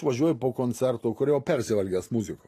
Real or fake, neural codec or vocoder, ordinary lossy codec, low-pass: real; none; AAC, 48 kbps; 14.4 kHz